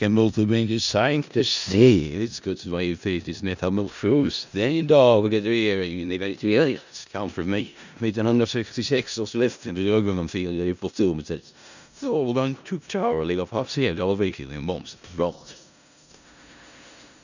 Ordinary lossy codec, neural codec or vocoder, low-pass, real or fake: none; codec, 16 kHz in and 24 kHz out, 0.4 kbps, LongCat-Audio-Codec, four codebook decoder; 7.2 kHz; fake